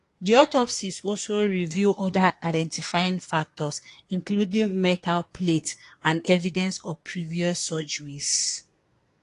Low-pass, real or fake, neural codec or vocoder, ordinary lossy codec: 10.8 kHz; fake; codec, 24 kHz, 1 kbps, SNAC; AAC, 48 kbps